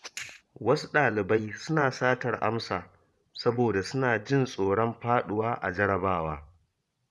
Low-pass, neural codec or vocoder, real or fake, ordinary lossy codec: none; vocoder, 24 kHz, 100 mel bands, Vocos; fake; none